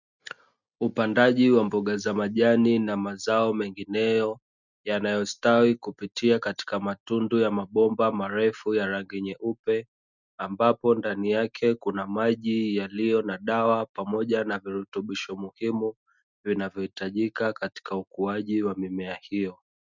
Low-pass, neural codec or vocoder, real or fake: 7.2 kHz; none; real